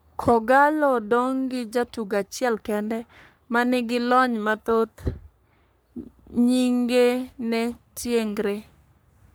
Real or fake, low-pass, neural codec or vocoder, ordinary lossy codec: fake; none; codec, 44.1 kHz, 3.4 kbps, Pupu-Codec; none